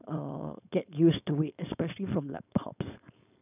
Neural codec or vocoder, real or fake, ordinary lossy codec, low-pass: codec, 16 kHz, 4.8 kbps, FACodec; fake; none; 3.6 kHz